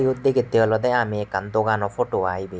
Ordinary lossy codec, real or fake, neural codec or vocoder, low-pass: none; real; none; none